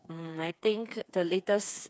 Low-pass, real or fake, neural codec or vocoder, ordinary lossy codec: none; fake; codec, 16 kHz, 4 kbps, FreqCodec, smaller model; none